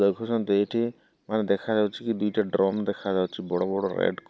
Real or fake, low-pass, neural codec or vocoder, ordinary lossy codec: real; none; none; none